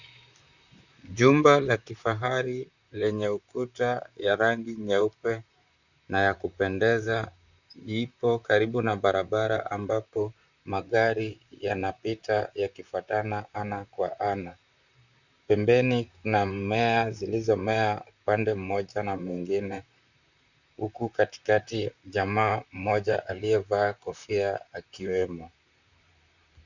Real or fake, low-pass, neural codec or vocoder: fake; 7.2 kHz; vocoder, 44.1 kHz, 128 mel bands, Pupu-Vocoder